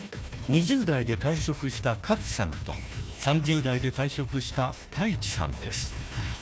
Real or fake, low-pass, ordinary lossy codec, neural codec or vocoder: fake; none; none; codec, 16 kHz, 1 kbps, FunCodec, trained on Chinese and English, 50 frames a second